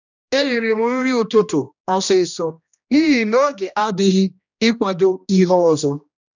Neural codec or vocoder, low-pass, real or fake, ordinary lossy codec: codec, 16 kHz, 1 kbps, X-Codec, HuBERT features, trained on general audio; 7.2 kHz; fake; none